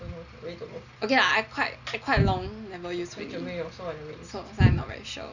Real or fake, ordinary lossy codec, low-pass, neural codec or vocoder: real; none; 7.2 kHz; none